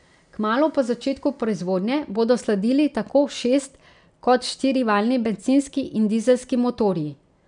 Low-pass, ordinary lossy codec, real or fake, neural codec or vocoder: 9.9 kHz; none; real; none